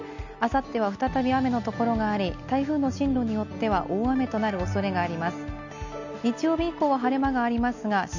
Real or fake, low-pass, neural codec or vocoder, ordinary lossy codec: real; 7.2 kHz; none; none